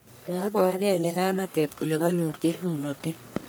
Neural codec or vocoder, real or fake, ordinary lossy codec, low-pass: codec, 44.1 kHz, 1.7 kbps, Pupu-Codec; fake; none; none